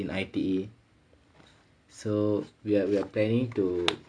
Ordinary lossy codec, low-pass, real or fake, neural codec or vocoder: none; 9.9 kHz; real; none